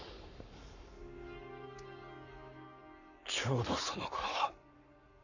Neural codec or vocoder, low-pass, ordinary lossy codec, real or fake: codec, 44.1 kHz, 7.8 kbps, Pupu-Codec; 7.2 kHz; none; fake